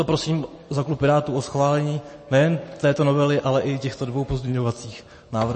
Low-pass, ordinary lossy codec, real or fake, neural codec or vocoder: 10.8 kHz; MP3, 32 kbps; real; none